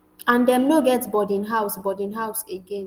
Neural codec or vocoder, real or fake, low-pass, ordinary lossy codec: none; real; 19.8 kHz; Opus, 24 kbps